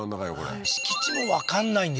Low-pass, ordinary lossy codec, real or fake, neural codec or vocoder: none; none; real; none